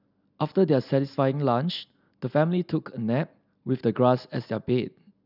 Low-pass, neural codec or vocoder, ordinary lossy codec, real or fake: 5.4 kHz; none; none; real